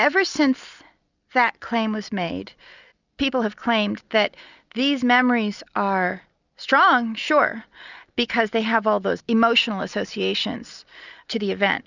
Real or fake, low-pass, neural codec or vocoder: real; 7.2 kHz; none